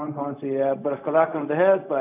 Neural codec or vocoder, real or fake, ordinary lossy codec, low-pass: codec, 16 kHz, 0.4 kbps, LongCat-Audio-Codec; fake; none; 3.6 kHz